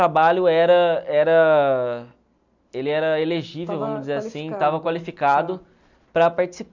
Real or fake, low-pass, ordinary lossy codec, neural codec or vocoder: real; 7.2 kHz; none; none